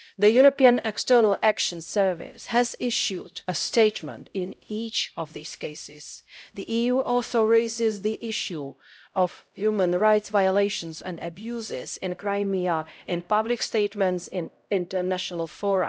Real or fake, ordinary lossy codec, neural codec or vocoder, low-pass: fake; none; codec, 16 kHz, 0.5 kbps, X-Codec, HuBERT features, trained on LibriSpeech; none